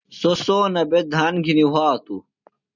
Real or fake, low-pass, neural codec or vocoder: real; 7.2 kHz; none